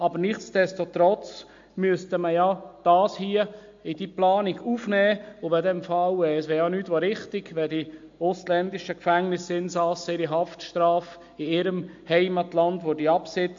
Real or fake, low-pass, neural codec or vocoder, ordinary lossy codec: real; 7.2 kHz; none; MP3, 48 kbps